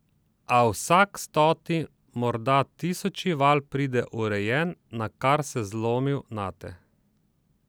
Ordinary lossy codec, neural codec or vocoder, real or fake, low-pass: none; none; real; none